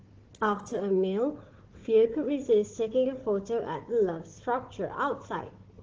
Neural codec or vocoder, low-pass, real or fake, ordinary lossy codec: codec, 16 kHz, 4 kbps, FunCodec, trained on Chinese and English, 50 frames a second; 7.2 kHz; fake; Opus, 16 kbps